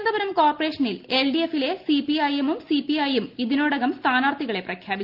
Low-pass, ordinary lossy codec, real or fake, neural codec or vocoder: 5.4 kHz; Opus, 32 kbps; real; none